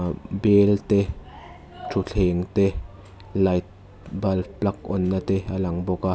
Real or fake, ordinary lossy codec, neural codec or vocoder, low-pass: real; none; none; none